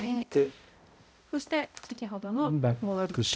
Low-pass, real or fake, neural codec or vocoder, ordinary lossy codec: none; fake; codec, 16 kHz, 0.5 kbps, X-Codec, HuBERT features, trained on balanced general audio; none